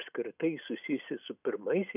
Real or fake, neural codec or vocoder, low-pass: real; none; 3.6 kHz